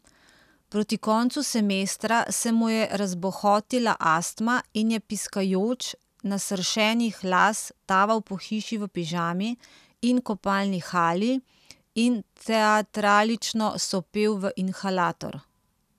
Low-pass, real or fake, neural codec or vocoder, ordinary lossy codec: 14.4 kHz; real; none; none